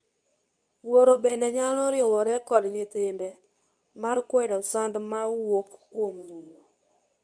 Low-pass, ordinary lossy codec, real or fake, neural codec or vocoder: 9.9 kHz; none; fake; codec, 24 kHz, 0.9 kbps, WavTokenizer, medium speech release version 2